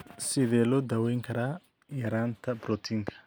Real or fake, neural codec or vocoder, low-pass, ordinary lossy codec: real; none; none; none